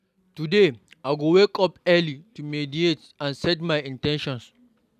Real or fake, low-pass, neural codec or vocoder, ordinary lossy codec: real; 14.4 kHz; none; none